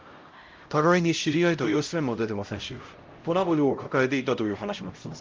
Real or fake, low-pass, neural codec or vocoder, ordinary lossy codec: fake; 7.2 kHz; codec, 16 kHz, 0.5 kbps, X-Codec, HuBERT features, trained on LibriSpeech; Opus, 24 kbps